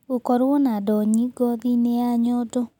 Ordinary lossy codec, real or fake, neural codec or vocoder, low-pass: none; real; none; 19.8 kHz